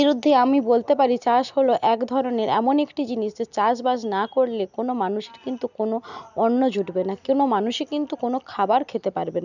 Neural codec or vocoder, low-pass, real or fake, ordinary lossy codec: none; 7.2 kHz; real; none